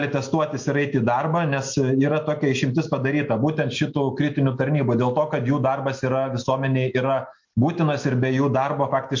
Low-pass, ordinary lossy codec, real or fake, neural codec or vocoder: 7.2 kHz; MP3, 48 kbps; real; none